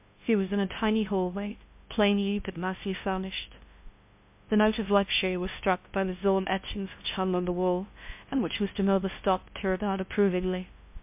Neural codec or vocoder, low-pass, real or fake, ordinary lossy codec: codec, 16 kHz, 0.5 kbps, FunCodec, trained on LibriTTS, 25 frames a second; 3.6 kHz; fake; MP3, 32 kbps